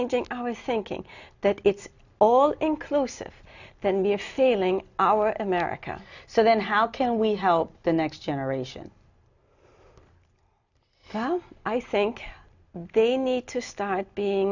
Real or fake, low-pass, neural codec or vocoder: real; 7.2 kHz; none